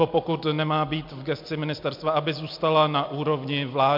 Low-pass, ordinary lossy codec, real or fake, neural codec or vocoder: 5.4 kHz; MP3, 48 kbps; real; none